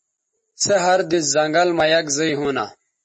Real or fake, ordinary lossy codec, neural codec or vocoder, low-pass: real; MP3, 32 kbps; none; 9.9 kHz